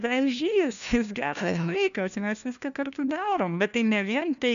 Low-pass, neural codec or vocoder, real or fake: 7.2 kHz; codec, 16 kHz, 1 kbps, FunCodec, trained on LibriTTS, 50 frames a second; fake